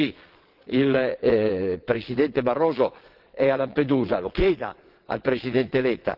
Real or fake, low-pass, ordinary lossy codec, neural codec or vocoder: fake; 5.4 kHz; Opus, 16 kbps; vocoder, 22.05 kHz, 80 mel bands, WaveNeXt